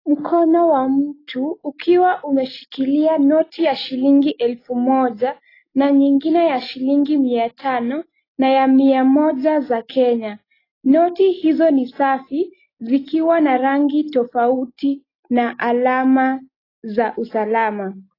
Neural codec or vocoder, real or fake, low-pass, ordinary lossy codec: none; real; 5.4 kHz; AAC, 24 kbps